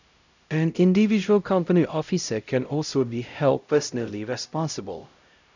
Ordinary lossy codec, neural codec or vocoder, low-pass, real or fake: none; codec, 16 kHz, 0.5 kbps, X-Codec, HuBERT features, trained on LibriSpeech; 7.2 kHz; fake